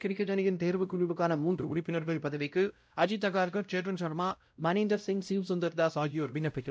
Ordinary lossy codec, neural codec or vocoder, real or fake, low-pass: none; codec, 16 kHz, 0.5 kbps, X-Codec, WavLM features, trained on Multilingual LibriSpeech; fake; none